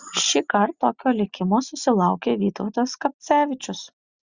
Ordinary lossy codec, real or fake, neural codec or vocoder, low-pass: Opus, 64 kbps; real; none; 7.2 kHz